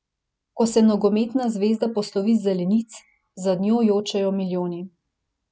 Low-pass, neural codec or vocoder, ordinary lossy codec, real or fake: none; none; none; real